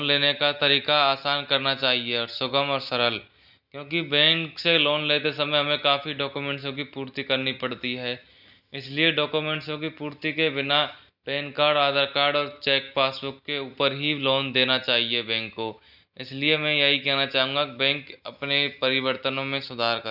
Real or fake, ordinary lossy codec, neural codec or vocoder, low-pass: real; none; none; 5.4 kHz